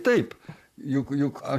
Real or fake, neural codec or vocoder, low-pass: fake; vocoder, 44.1 kHz, 128 mel bands, Pupu-Vocoder; 14.4 kHz